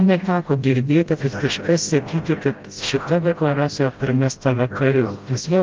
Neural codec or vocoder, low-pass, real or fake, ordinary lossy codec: codec, 16 kHz, 0.5 kbps, FreqCodec, smaller model; 7.2 kHz; fake; Opus, 24 kbps